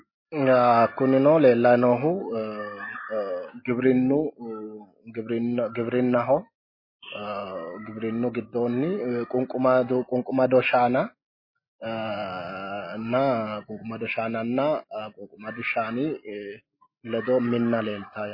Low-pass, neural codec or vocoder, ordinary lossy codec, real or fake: 5.4 kHz; none; MP3, 24 kbps; real